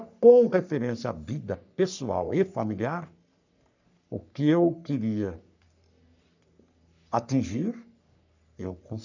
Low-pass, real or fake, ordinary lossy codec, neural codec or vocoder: 7.2 kHz; fake; none; codec, 44.1 kHz, 3.4 kbps, Pupu-Codec